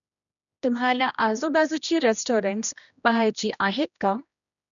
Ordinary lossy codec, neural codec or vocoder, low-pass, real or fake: none; codec, 16 kHz, 1 kbps, X-Codec, HuBERT features, trained on general audio; 7.2 kHz; fake